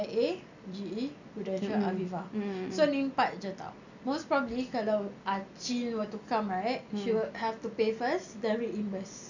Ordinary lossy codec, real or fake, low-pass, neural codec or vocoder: none; real; 7.2 kHz; none